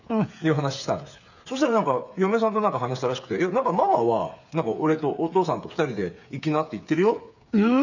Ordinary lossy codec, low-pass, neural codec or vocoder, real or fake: none; 7.2 kHz; codec, 16 kHz, 8 kbps, FreqCodec, smaller model; fake